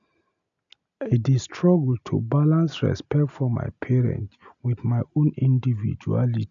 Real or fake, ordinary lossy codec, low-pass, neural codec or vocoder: real; none; 7.2 kHz; none